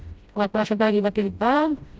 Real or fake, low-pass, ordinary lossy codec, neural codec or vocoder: fake; none; none; codec, 16 kHz, 0.5 kbps, FreqCodec, smaller model